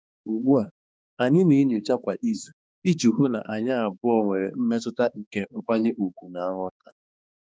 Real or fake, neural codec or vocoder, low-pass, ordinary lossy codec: fake; codec, 16 kHz, 2 kbps, X-Codec, HuBERT features, trained on balanced general audio; none; none